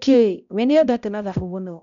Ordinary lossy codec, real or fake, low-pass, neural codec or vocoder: none; fake; 7.2 kHz; codec, 16 kHz, 0.5 kbps, X-Codec, HuBERT features, trained on balanced general audio